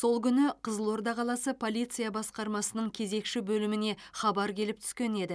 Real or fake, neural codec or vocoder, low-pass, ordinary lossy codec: real; none; none; none